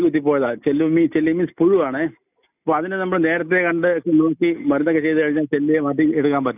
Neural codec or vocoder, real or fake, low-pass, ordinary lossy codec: none; real; 3.6 kHz; none